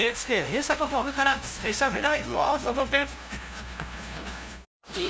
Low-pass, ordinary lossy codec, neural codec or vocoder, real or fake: none; none; codec, 16 kHz, 0.5 kbps, FunCodec, trained on LibriTTS, 25 frames a second; fake